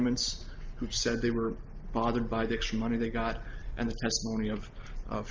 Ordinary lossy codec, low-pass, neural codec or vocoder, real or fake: Opus, 32 kbps; 7.2 kHz; none; real